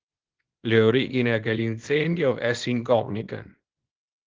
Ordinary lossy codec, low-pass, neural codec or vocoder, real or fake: Opus, 16 kbps; 7.2 kHz; codec, 24 kHz, 0.9 kbps, WavTokenizer, small release; fake